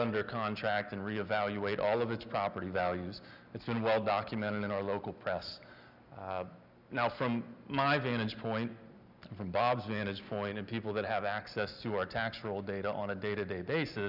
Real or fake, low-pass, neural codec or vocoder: real; 5.4 kHz; none